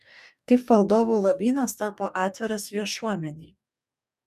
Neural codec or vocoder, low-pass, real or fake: codec, 44.1 kHz, 2.6 kbps, DAC; 14.4 kHz; fake